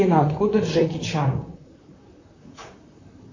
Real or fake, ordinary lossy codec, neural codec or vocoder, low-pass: fake; AAC, 48 kbps; vocoder, 44.1 kHz, 128 mel bands, Pupu-Vocoder; 7.2 kHz